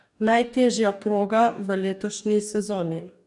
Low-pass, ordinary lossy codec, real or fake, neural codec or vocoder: 10.8 kHz; none; fake; codec, 44.1 kHz, 2.6 kbps, DAC